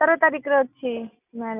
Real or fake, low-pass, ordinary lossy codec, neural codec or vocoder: real; 3.6 kHz; none; none